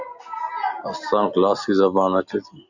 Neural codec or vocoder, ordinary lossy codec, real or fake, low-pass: none; Opus, 64 kbps; real; 7.2 kHz